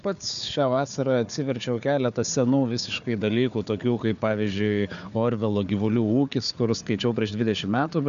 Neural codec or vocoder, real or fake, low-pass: codec, 16 kHz, 4 kbps, FunCodec, trained on Chinese and English, 50 frames a second; fake; 7.2 kHz